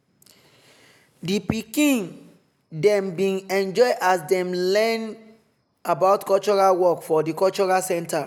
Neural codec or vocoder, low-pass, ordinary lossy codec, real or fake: none; 19.8 kHz; none; real